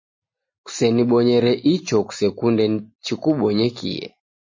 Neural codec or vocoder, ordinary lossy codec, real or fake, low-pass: none; MP3, 32 kbps; real; 7.2 kHz